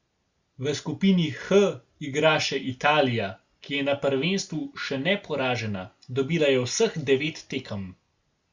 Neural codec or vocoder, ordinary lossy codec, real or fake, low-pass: none; Opus, 64 kbps; real; 7.2 kHz